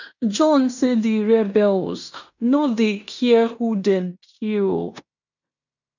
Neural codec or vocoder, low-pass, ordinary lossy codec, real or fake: codec, 16 kHz in and 24 kHz out, 0.9 kbps, LongCat-Audio-Codec, fine tuned four codebook decoder; 7.2 kHz; none; fake